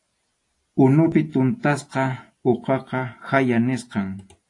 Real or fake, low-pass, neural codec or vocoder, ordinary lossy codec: real; 10.8 kHz; none; AAC, 48 kbps